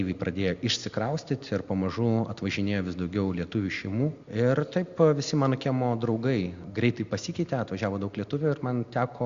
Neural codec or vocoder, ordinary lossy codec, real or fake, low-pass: none; Opus, 64 kbps; real; 7.2 kHz